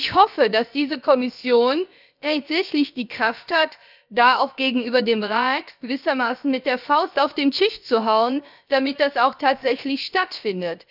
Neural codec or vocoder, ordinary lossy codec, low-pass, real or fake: codec, 16 kHz, about 1 kbps, DyCAST, with the encoder's durations; none; 5.4 kHz; fake